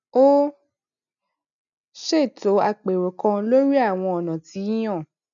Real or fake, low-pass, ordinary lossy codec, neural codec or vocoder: real; 7.2 kHz; none; none